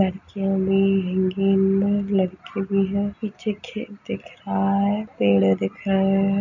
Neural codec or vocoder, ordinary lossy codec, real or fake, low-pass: none; none; real; 7.2 kHz